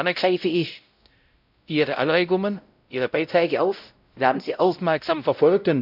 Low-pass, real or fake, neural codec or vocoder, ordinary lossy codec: 5.4 kHz; fake; codec, 16 kHz, 0.5 kbps, X-Codec, WavLM features, trained on Multilingual LibriSpeech; none